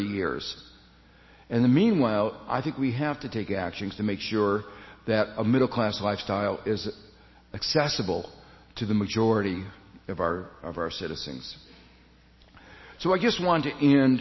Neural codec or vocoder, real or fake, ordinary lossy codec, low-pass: none; real; MP3, 24 kbps; 7.2 kHz